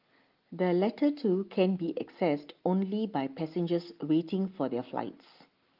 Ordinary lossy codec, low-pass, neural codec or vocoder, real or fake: Opus, 32 kbps; 5.4 kHz; none; real